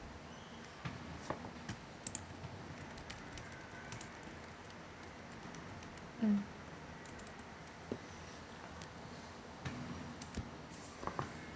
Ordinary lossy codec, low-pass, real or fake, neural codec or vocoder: none; none; real; none